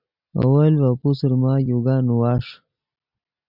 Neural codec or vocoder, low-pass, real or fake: none; 5.4 kHz; real